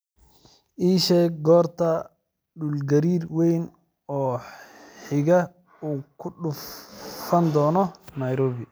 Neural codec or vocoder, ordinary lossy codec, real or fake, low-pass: none; none; real; none